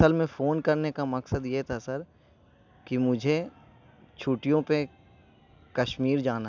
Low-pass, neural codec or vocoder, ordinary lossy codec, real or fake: 7.2 kHz; none; none; real